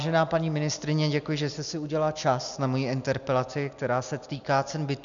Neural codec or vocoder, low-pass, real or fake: none; 7.2 kHz; real